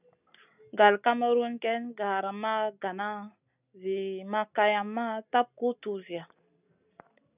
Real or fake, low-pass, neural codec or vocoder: real; 3.6 kHz; none